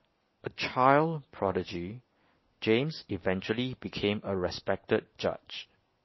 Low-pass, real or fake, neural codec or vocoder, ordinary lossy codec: 7.2 kHz; real; none; MP3, 24 kbps